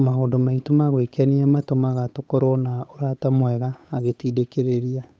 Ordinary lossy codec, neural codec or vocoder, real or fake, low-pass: none; codec, 16 kHz, 8 kbps, FunCodec, trained on Chinese and English, 25 frames a second; fake; none